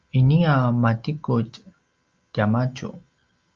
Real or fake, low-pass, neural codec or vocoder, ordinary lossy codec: real; 7.2 kHz; none; Opus, 32 kbps